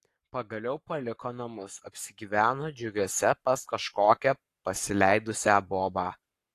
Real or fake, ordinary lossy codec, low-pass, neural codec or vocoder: fake; AAC, 48 kbps; 14.4 kHz; codec, 44.1 kHz, 7.8 kbps, Pupu-Codec